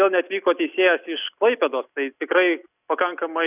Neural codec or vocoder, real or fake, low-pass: none; real; 3.6 kHz